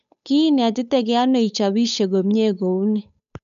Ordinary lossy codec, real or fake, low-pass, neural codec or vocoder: none; fake; 7.2 kHz; codec, 16 kHz, 8 kbps, FunCodec, trained on Chinese and English, 25 frames a second